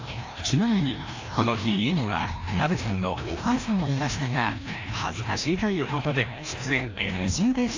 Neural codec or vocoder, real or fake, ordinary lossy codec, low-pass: codec, 16 kHz, 1 kbps, FreqCodec, larger model; fake; AAC, 48 kbps; 7.2 kHz